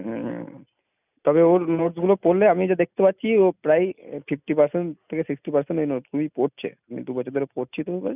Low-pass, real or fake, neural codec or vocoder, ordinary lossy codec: 3.6 kHz; real; none; none